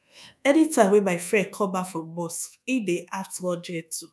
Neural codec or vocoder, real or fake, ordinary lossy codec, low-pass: codec, 24 kHz, 1.2 kbps, DualCodec; fake; none; none